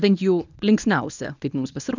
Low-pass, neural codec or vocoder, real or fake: 7.2 kHz; codec, 24 kHz, 0.9 kbps, WavTokenizer, medium speech release version 1; fake